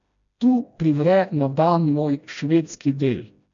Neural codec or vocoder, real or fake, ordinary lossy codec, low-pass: codec, 16 kHz, 1 kbps, FreqCodec, smaller model; fake; MP3, 64 kbps; 7.2 kHz